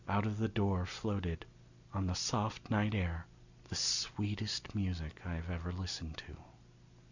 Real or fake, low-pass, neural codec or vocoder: real; 7.2 kHz; none